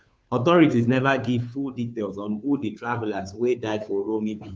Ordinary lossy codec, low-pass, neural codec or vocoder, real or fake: none; none; codec, 16 kHz, 2 kbps, FunCodec, trained on Chinese and English, 25 frames a second; fake